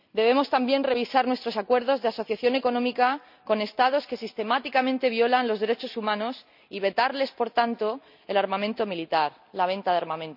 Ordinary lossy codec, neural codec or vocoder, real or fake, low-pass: none; none; real; 5.4 kHz